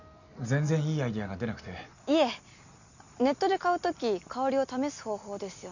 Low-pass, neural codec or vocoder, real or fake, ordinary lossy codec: 7.2 kHz; none; real; none